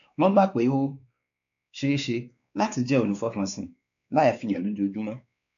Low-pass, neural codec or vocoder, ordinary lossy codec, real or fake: 7.2 kHz; codec, 16 kHz, 2 kbps, X-Codec, WavLM features, trained on Multilingual LibriSpeech; none; fake